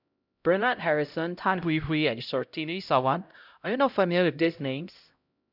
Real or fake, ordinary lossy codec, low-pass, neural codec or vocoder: fake; none; 5.4 kHz; codec, 16 kHz, 0.5 kbps, X-Codec, HuBERT features, trained on LibriSpeech